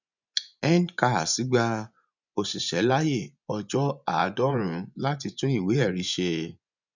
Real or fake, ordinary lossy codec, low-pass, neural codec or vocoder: fake; none; 7.2 kHz; vocoder, 24 kHz, 100 mel bands, Vocos